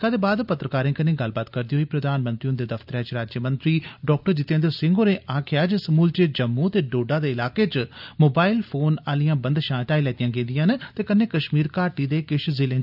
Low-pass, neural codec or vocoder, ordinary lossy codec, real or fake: 5.4 kHz; none; none; real